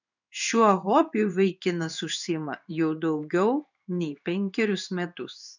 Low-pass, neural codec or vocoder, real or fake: 7.2 kHz; codec, 16 kHz in and 24 kHz out, 1 kbps, XY-Tokenizer; fake